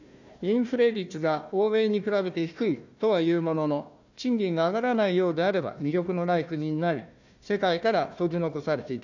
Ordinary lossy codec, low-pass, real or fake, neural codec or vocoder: none; 7.2 kHz; fake; codec, 16 kHz, 1 kbps, FunCodec, trained on Chinese and English, 50 frames a second